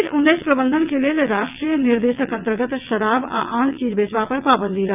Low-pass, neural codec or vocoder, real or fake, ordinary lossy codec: 3.6 kHz; vocoder, 22.05 kHz, 80 mel bands, WaveNeXt; fake; none